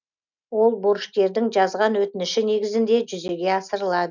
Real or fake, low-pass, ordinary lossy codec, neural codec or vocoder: real; 7.2 kHz; none; none